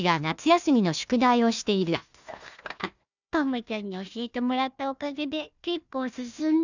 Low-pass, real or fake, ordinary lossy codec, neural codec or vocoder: 7.2 kHz; fake; none; codec, 16 kHz, 1 kbps, FunCodec, trained on Chinese and English, 50 frames a second